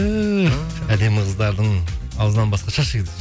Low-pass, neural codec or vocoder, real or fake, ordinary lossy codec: none; none; real; none